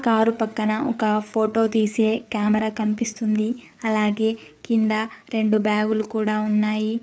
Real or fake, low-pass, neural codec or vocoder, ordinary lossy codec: fake; none; codec, 16 kHz, 4 kbps, FreqCodec, larger model; none